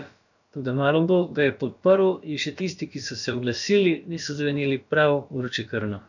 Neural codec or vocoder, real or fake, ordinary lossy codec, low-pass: codec, 16 kHz, about 1 kbps, DyCAST, with the encoder's durations; fake; none; 7.2 kHz